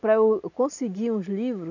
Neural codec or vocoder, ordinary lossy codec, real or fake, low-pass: none; none; real; 7.2 kHz